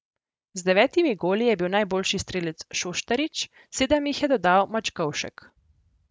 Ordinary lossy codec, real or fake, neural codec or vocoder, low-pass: none; real; none; none